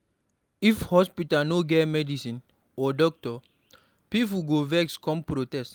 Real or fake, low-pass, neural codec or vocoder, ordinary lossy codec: real; 19.8 kHz; none; Opus, 32 kbps